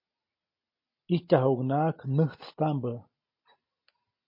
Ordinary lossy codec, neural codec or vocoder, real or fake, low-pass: MP3, 32 kbps; none; real; 5.4 kHz